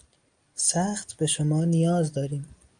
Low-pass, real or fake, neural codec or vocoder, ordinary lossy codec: 9.9 kHz; real; none; Opus, 32 kbps